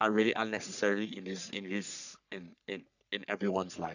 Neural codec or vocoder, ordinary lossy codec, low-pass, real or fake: codec, 44.1 kHz, 3.4 kbps, Pupu-Codec; none; 7.2 kHz; fake